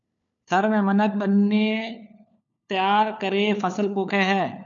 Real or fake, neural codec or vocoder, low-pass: fake; codec, 16 kHz, 4 kbps, FunCodec, trained on LibriTTS, 50 frames a second; 7.2 kHz